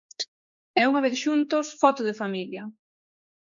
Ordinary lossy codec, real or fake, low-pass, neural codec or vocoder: MP3, 64 kbps; fake; 7.2 kHz; codec, 16 kHz, 4 kbps, X-Codec, HuBERT features, trained on general audio